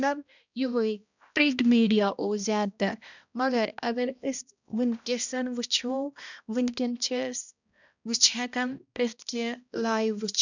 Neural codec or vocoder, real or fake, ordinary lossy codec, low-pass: codec, 16 kHz, 1 kbps, X-Codec, HuBERT features, trained on balanced general audio; fake; none; 7.2 kHz